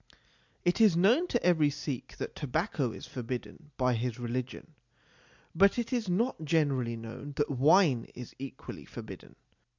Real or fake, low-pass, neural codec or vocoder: real; 7.2 kHz; none